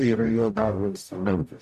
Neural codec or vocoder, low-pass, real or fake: codec, 44.1 kHz, 0.9 kbps, DAC; 14.4 kHz; fake